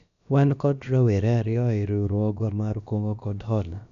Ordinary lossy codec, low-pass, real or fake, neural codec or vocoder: none; 7.2 kHz; fake; codec, 16 kHz, about 1 kbps, DyCAST, with the encoder's durations